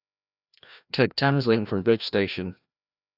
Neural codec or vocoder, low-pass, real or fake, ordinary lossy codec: codec, 16 kHz, 1 kbps, FreqCodec, larger model; 5.4 kHz; fake; none